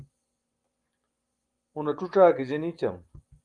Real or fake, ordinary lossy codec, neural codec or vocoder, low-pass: fake; Opus, 32 kbps; vocoder, 24 kHz, 100 mel bands, Vocos; 9.9 kHz